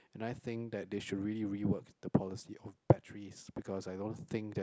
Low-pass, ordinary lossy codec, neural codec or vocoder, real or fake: none; none; none; real